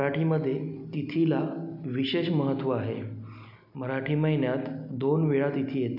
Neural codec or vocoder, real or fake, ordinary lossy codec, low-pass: none; real; none; 5.4 kHz